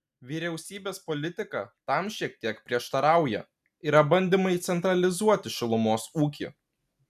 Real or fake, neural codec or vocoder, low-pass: real; none; 14.4 kHz